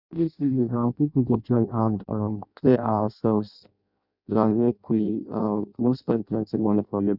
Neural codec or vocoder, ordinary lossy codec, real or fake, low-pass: codec, 16 kHz in and 24 kHz out, 0.6 kbps, FireRedTTS-2 codec; none; fake; 5.4 kHz